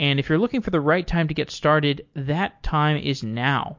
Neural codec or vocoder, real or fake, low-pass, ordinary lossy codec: none; real; 7.2 kHz; MP3, 48 kbps